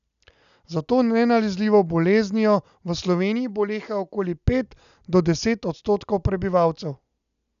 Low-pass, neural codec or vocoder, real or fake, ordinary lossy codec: 7.2 kHz; none; real; none